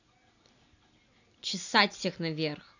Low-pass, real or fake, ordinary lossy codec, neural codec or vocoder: 7.2 kHz; real; none; none